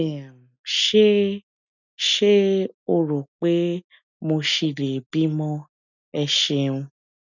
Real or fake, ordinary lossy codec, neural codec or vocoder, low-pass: real; none; none; 7.2 kHz